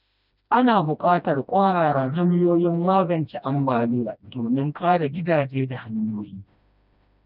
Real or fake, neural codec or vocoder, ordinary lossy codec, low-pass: fake; codec, 16 kHz, 1 kbps, FreqCodec, smaller model; none; 5.4 kHz